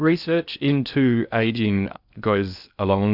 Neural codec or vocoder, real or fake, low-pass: codec, 16 kHz in and 24 kHz out, 0.6 kbps, FocalCodec, streaming, 2048 codes; fake; 5.4 kHz